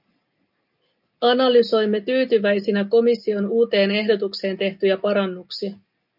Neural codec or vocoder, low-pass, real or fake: none; 5.4 kHz; real